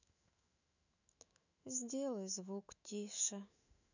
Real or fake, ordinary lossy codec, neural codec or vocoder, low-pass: fake; none; autoencoder, 48 kHz, 128 numbers a frame, DAC-VAE, trained on Japanese speech; 7.2 kHz